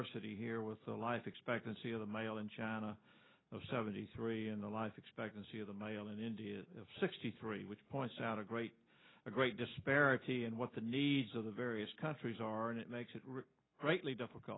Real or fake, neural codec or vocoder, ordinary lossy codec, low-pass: real; none; AAC, 16 kbps; 7.2 kHz